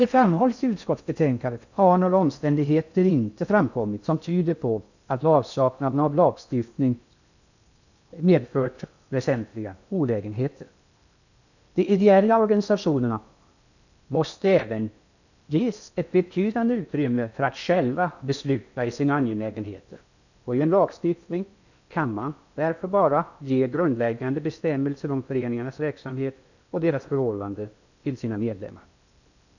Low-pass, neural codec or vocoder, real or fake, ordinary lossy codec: 7.2 kHz; codec, 16 kHz in and 24 kHz out, 0.6 kbps, FocalCodec, streaming, 4096 codes; fake; none